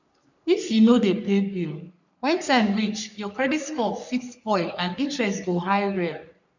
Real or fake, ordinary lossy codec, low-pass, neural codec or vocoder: fake; none; 7.2 kHz; codec, 44.1 kHz, 3.4 kbps, Pupu-Codec